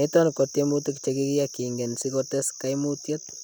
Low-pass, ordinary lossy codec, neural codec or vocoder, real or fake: none; none; none; real